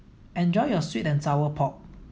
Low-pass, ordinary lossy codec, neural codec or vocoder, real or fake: none; none; none; real